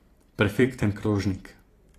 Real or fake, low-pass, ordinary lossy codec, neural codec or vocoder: fake; 14.4 kHz; AAC, 48 kbps; vocoder, 44.1 kHz, 128 mel bands every 256 samples, BigVGAN v2